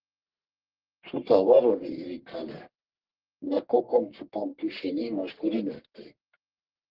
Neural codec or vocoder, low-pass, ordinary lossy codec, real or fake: codec, 44.1 kHz, 1.7 kbps, Pupu-Codec; 5.4 kHz; Opus, 16 kbps; fake